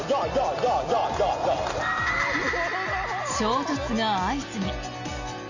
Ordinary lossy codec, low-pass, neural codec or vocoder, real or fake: Opus, 64 kbps; 7.2 kHz; none; real